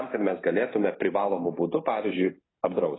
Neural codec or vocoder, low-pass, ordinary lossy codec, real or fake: none; 7.2 kHz; AAC, 16 kbps; real